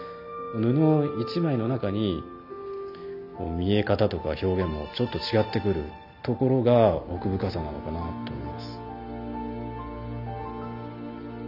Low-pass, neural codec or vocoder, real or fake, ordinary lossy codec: 5.4 kHz; none; real; none